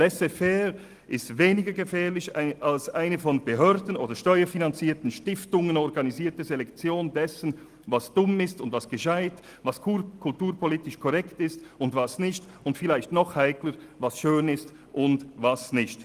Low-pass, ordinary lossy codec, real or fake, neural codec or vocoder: 14.4 kHz; Opus, 24 kbps; real; none